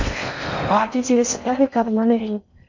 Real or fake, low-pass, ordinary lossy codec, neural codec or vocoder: fake; 7.2 kHz; MP3, 48 kbps; codec, 16 kHz in and 24 kHz out, 0.6 kbps, FocalCodec, streaming, 4096 codes